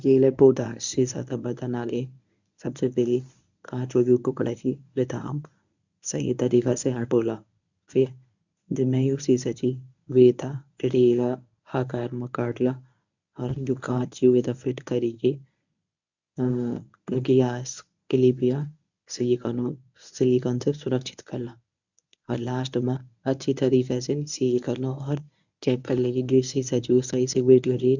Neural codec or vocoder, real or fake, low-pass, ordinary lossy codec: codec, 24 kHz, 0.9 kbps, WavTokenizer, medium speech release version 1; fake; 7.2 kHz; none